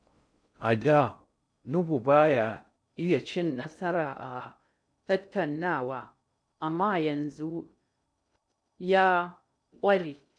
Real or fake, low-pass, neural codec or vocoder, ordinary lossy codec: fake; 9.9 kHz; codec, 16 kHz in and 24 kHz out, 0.6 kbps, FocalCodec, streaming, 2048 codes; none